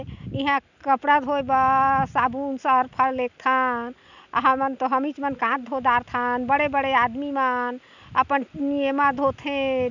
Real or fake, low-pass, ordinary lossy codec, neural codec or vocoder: real; 7.2 kHz; none; none